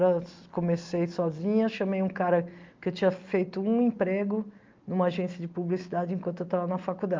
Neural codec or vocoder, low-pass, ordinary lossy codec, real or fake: none; 7.2 kHz; Opus, 32 kbps; real